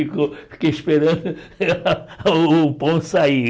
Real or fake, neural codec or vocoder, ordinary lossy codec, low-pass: real; none; none; none